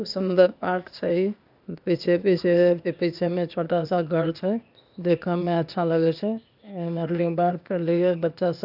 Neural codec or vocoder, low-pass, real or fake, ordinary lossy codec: codec, 16 kHz, 0.8 kbps, ZipCodec; 5.4 kHz; fake; none